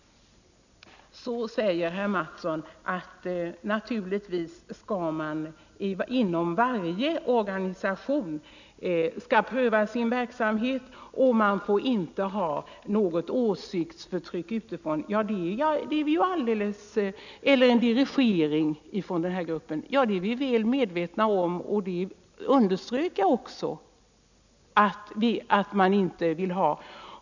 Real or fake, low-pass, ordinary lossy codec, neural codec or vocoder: real; 7.2 kHz; none; none